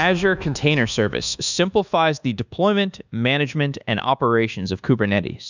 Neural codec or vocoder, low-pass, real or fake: codec, 24 kHz, 1.2 kbps, DualCodec; 7.2 kHz; fake